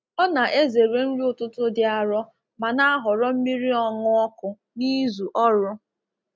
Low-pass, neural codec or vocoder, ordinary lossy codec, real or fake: none; none; none; real